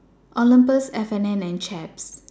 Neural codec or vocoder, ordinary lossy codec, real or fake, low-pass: none; none; real; none